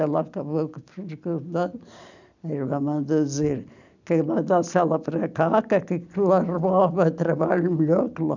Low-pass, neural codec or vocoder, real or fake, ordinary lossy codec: 7.2 kHz; none; real; none